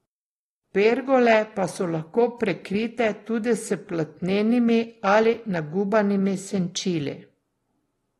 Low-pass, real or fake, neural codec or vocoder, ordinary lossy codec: 19.8 kHz; real; none; AAC, 32 kbps